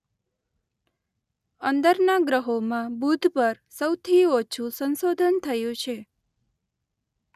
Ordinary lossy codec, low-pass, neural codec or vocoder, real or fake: none; 14.4 kHz; none; real